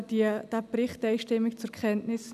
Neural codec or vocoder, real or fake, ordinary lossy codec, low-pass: none; real; none; 14.4 kHz